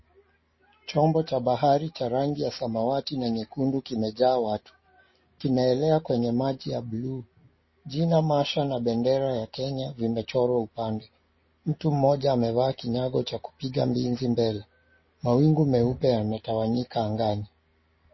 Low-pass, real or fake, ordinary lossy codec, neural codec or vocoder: 7.2 kHz; real; MP3, 24 kbps; none